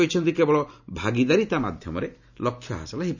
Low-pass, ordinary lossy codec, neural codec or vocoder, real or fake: 7.2 kHz; none; none; real